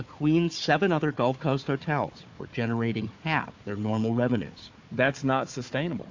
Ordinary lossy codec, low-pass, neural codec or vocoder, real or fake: AAC, 48 kbps; 7.2 kHz; codec, 16 kHz, 4 kbps, FunCodec, trained on Chinese and English, 50 frames a second; fake